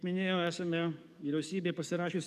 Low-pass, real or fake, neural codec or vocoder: 14.4 kHz; fake; codec, 44.1 kHz, 7.8 kbps, DAC